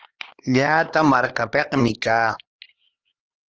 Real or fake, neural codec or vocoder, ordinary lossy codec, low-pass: fake; codec, 16 kHz, 4 kbps, X-Codec, HuBERT features, trained on LibriSpeech; Opus, 16 kbps; 7.2 kHz